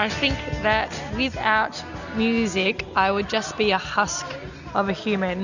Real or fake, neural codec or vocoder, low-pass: real; none; 7.2 kHz